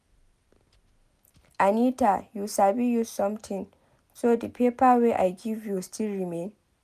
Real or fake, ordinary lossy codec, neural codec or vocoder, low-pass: real; none; none; 14.4 kHz